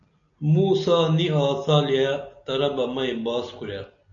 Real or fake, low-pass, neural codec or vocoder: real; 7.2 kHz; none